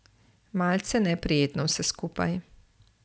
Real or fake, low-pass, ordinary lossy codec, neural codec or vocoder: real; none; none; none